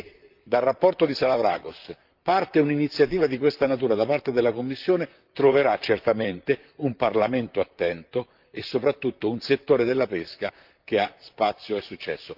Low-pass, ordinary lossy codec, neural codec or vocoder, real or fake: 5.4 kHz; Opus, 24 kbps; vocoder, 44.1 kHz, 128 mel bands, Pupu-Vocoder; fake